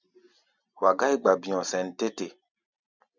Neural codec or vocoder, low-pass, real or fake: none; 7.2 kHz; real